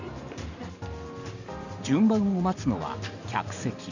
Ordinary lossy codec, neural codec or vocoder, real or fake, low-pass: none; vocoder, 44.1 kHz, 128 mel bands every 512 samples, BigVGAN v2; fake; 7.2 kHz